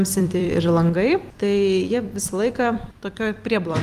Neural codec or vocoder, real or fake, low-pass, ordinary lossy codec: vocoder, 44.1 kHz, 128 mel bands every 256 samples, BigVGAN v2; fake; 14.4 kHz; Opus, 32 kbps